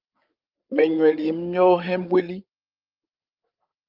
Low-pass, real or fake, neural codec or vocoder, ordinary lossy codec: 5.4 kHz; fake; codec, 16 kHz, 16 kbps, FreqCodec, larger model; Opus, 24 kbps